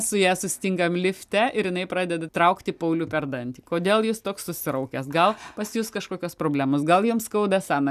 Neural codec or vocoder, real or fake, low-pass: none; real; 14.4 kHz